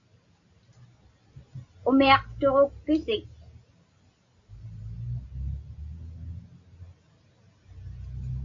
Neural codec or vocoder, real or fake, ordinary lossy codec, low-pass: none; real; AAC, 48 kbps; 7.2 kHz